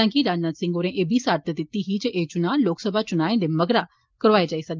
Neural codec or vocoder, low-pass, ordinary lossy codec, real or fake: none; 7.2 kHz; Opus, 24 kbps; real